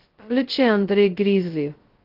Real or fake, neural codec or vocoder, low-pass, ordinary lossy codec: fake; codec, 16 kHz, 0.2 kbps, FocalCodec; 5.4 kHz; Opus, 16 kbps